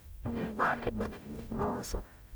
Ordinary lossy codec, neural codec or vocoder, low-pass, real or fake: none; codec, 44.1 kHz, 0.9 kbps, DAC; none; fake